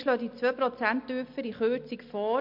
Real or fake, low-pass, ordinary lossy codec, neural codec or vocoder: real; 5.4 kHz; MP3, 48 kbps; none